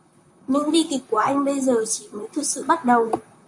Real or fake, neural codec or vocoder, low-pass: fake; vocoder, 44.1 kHz, 128 mel bands, Pupu-Vocoder; 10.8 kHz